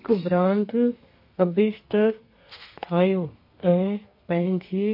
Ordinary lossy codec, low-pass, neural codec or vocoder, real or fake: MP3, 32 kbps; 5.4 kHz; codec, 32 kHz, 1.9 kbps, SNAC; fake